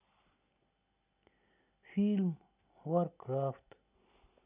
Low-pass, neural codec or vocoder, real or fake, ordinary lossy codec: 3.6 kHz; none; real; none